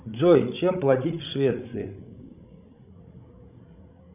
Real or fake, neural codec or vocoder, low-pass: fake; codec, 16 kHz, 16 kbps, FreqCodec, larger model; 3.6 kHz